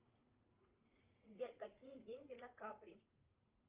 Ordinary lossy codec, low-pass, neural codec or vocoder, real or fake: Opus, 32 kbps; 3.6 kHz; vocoder, 44.1 kHz, 80 mel bands, Vocos; fake